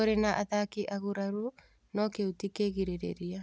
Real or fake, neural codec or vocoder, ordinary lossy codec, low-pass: real; none; none; none